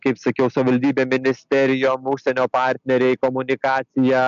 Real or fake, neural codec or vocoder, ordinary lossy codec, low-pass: real; none; MP3, 64 kbps; 7.2 kHz